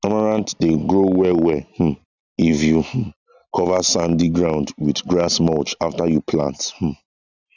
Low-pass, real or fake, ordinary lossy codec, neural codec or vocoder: 7.2 kHz; real; none; none